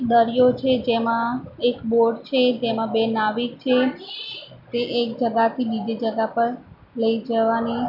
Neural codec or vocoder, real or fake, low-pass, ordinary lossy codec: none; real; 5.4 kHz; none